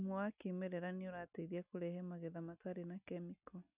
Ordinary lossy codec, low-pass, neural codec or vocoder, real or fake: none; 3.6 kHz; none; real